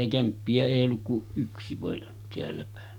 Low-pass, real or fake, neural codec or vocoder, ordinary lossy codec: 19.8 kHz; fake; codec, 44.1 kHz, 7.8 kbps, DAC; none